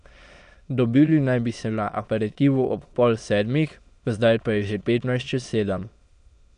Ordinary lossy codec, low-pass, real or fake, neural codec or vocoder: none; 9.9 kHz; fake; autoencoder, 22.05 kHz, a latent of 192 numbers a frame, VITS, trained on many speakers